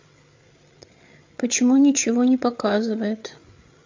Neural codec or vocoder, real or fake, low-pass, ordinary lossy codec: codec, 16 kHz, 8 kbps, FreqCodec, larger model; fake; 7.2 kHz; MP3, 48 kbps